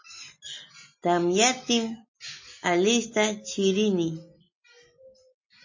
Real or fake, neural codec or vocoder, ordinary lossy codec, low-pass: real; none; MP3, 32 kbps; 7.2 kHz